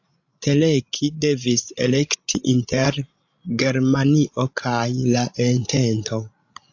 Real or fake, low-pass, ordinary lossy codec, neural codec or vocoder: fake; 7.2 kHz; AAC, 48 kbps; codec, 16 kHz, 16 kbps, FreqCodec, larger model